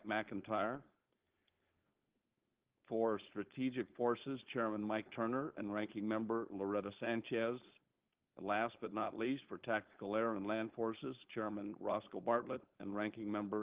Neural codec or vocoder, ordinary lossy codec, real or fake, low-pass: codec, 16 kHz, 4.8 kbps, FACodec; Opus, 16 kbps; fake; 3.6 kHz